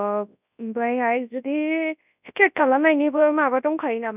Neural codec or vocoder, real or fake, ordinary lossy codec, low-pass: codec, 24 kHz, 0.9 kbps, WavTokenizer, large speech release; fake; none; 3.6 kHz